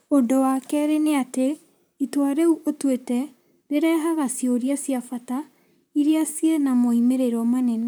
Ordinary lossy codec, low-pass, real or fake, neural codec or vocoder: none; none; fake; vocoder, 44.1 kHz, 128 mel bands, Pupu-Vocoder